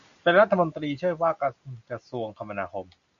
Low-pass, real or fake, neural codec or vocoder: 7.2 kHz; real; none